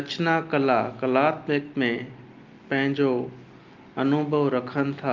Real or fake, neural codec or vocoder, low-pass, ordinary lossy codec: real; none; 7.2 kHz; Opus, 24 kbps